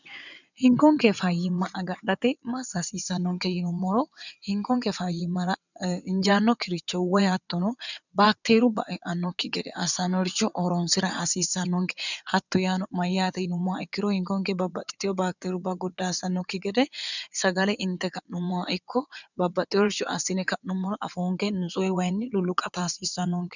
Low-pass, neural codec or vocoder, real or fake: 7.2 kHz; vocoder, 22.05 kHz, 80 mel bands, WaveNeXt; fake